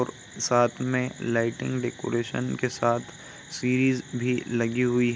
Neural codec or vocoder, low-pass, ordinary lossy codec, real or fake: none; none; none; real